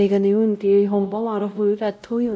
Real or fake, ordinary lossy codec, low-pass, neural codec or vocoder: fake; none; none; codec, 16 kHz, 0.5 kbps, X-Codec, WavLM features, trained on Multilingual LibriSpeech